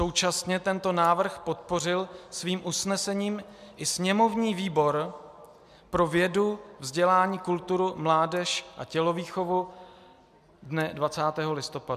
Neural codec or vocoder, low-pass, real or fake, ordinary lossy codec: none; 14.4 kHz; real; MP3, 96 kbps